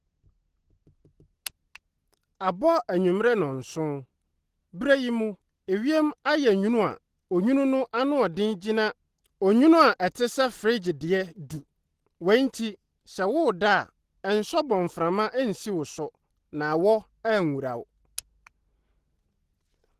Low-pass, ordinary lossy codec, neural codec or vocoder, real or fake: 14.4 kHz; Opus, 16 kbps; none; real